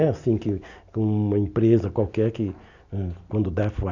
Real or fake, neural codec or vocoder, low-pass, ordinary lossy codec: real; none; 7.2 kHz; none